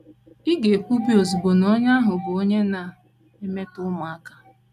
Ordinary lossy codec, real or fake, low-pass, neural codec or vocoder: none; real; 14.4 kHz; none